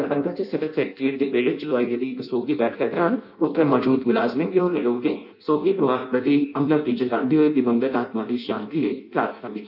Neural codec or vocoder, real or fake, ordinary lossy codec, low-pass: codec, 16 kHz in and 24 kHz out, 0.6 kbps, FireRedTTS-2 codec; fake; none; 5.4 kHz